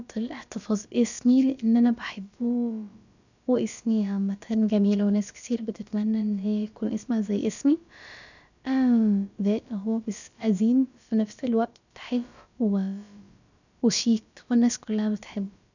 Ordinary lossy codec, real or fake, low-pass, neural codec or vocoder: none; fake; 7.2 kHz; codec, 16 kHz, about 1 kbps, DyCAST, with the encoder's durations